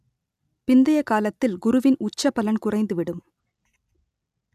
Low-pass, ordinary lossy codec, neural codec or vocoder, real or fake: 14.4 kHz; none; none; real